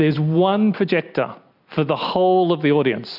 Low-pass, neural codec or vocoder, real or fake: 5.4 kHz; none; real